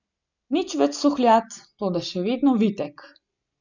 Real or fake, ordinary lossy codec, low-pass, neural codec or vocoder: real; none; 7.2 kHz; none